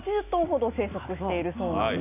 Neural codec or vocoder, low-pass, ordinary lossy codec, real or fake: none; 3.6 kHz; none; real